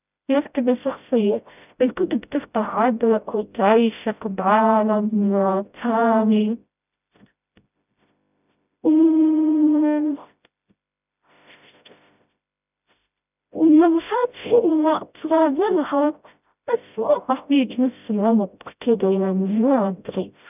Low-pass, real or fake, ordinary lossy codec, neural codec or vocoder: 3.6 kHz; fake; none; codec, 16 kHz, 0.5 kbps, FreqCodec, smaller model